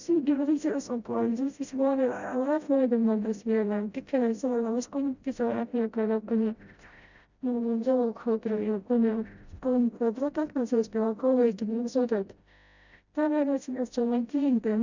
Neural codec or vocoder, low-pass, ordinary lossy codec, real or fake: codec, 16 kHz, 0.5 kbps, FreqCodec, smaller model; 7.2 kHz; Opus, 64 kbps; fake